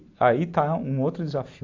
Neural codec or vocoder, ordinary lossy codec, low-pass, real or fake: none; AAC, 48 kbps; 7.2 kHz; real